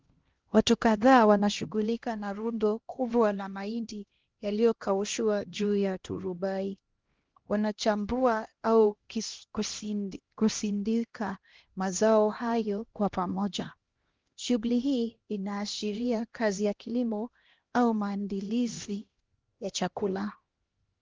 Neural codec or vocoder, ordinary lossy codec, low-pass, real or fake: codec, 16 kHz, 1 kbps, X-Codec, HuBERT features, trained on LibriSpeech; Opus, 16 kbps; 7.2 kHz; fake